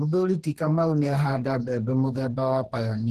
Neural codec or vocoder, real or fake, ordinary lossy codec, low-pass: codec, 44.1 kHz, 2.6 kbps, DAC; fake; Opus, 16 kbps; 14.4 kHz